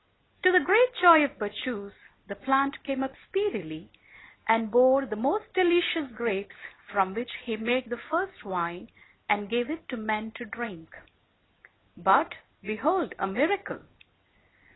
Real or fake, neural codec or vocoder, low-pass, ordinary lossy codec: real; none; 7.2 kHz; AAC, 16 kbps